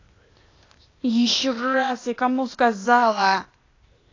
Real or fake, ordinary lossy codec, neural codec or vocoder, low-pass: fake; AAC, 32 kbps; codec, 16 kHz, 0.8 kbps, ZipCodec; 7.2 kHz